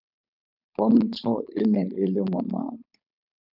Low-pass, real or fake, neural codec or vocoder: 5.4 kHz; fake; codec, 16 kHz, 4.8 kbps, FACodec